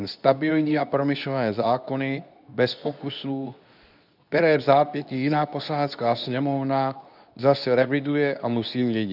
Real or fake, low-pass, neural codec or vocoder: fake; 5.4 kHz; codec, 24 kHz, 0.9 kbps, WavTokenizer, medium speech release version 2